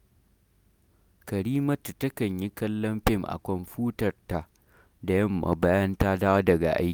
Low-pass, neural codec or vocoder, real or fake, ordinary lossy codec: none; none; real; none